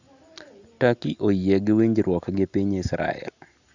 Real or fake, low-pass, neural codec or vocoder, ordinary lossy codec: real; 7.2 kHz; none; Opus, 64 kbps